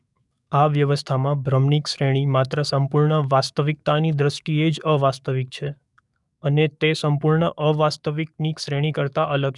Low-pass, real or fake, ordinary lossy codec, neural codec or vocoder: 10.8 kHz; fake; none; codec, 44.1 kHz, 7.8 kbps, DAC